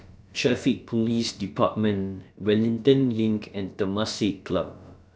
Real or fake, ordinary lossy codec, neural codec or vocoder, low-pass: fake; none; codec, 16 kHz, about 1 kbps, DyCAST, with the encoder's durations; none